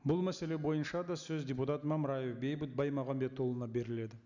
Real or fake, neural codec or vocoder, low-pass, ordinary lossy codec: real; none; 7.2 kHz; none